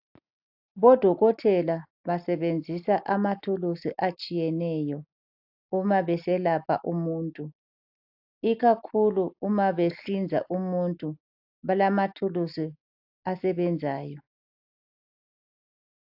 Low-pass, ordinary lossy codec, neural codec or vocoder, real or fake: 5.4 kHz; AAC, 48 kbps; none; real